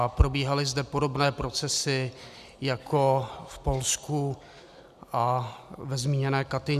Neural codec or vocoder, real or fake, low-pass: none; real; 14.4 kHz